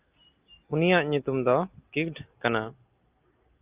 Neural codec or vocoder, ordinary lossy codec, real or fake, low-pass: none; Opus, 24 kbps; real; 3.6 kHz